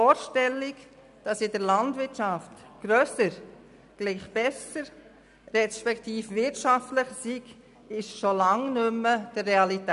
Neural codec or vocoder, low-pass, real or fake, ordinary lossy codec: none; 10.8 kHz; real; none